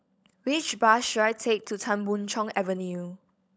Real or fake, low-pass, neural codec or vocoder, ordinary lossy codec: fake; none; codec, 16 kHz, 16 kbps, FunCodec, trained on LibriTTS, 50 frames a second; none